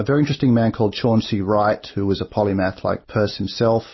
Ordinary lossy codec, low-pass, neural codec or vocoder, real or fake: MP3, 24 kbps; 7.2 kHz; none; real